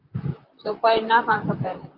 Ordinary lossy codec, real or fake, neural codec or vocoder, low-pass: Opus, 24 kbps; fake; vocoder, 44.1 kHz, 128 mel bands, Pupu-Vocoder; 5.4 kHz